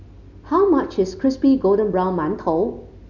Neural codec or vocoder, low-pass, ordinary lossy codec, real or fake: none; 7.2 kHz; none; real